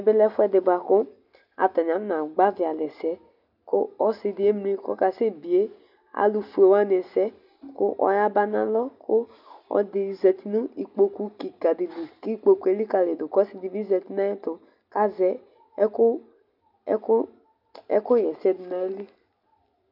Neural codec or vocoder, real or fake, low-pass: none; real; 5.4 kHz